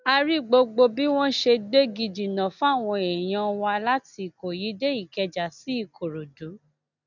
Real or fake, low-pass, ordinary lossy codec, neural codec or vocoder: real; 7.2 kHz; none; none